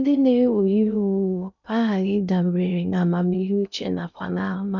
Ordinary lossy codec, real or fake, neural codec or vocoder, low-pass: none; fake; codec, 16 kHz in and 24 kHz out, 0.6 kbps, FocalCodec, streaming, 4096 codes; 7.2 kHz